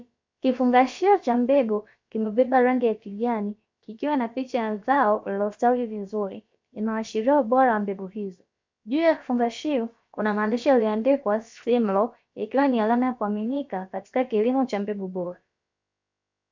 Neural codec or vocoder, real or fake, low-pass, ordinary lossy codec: codec, 16 kHz, about 1 kbps, DyCAST, with the encoder's durations; fake; 7.2 kHz; MP3, 64 kbps